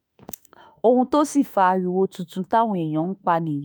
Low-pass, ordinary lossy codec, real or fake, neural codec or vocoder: none; none; fake; autoencoder, 48 kHz, 32 numbers a frame, DAC-VAE, trained on Japanese speech